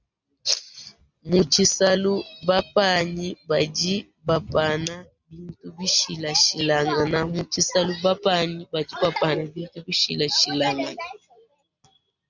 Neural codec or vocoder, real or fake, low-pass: none; real; 7.2 kHz